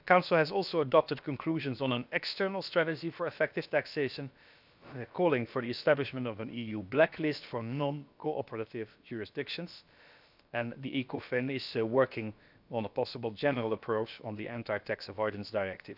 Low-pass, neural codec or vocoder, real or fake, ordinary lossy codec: 5.4 kHz; codec, 16 kHz, about 1 kbps, DyCAST, with the encoder's durations; fake; none